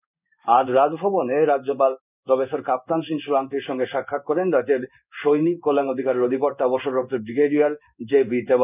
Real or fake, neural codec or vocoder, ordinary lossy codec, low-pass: fake; codec, 16 kHz in and 24 kHz out, 1 kbps, XY-Tokenizer; none; 3.6 kHz